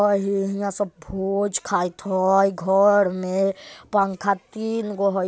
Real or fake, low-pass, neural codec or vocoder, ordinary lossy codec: real; none; none; none